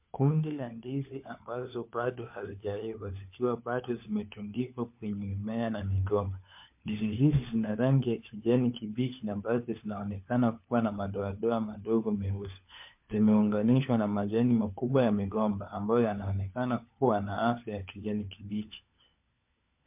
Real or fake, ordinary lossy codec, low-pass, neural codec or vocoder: fake; MP3, 32 kbps; 3.6 kHz; codec, 16 kHz, 4 kbps, FunCodec, trained on LibriTTS, 50 frames a second